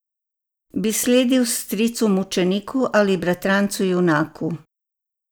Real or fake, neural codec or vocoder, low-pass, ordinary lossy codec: real; none; none; none